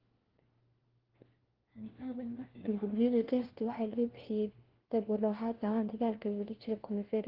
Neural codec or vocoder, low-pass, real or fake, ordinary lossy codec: codec, 16 kHz, 1 kbps, FunCodec, trained on LibriTTS, 50 frames a second; 5.4 kHz; fake; Opus, 16 kbps